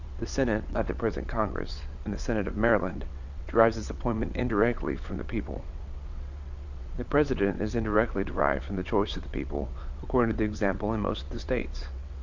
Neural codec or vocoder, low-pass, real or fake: vocoder, 22.05 kHz, 80 mel bands, WaveNeXt; 7.2 kHz; fake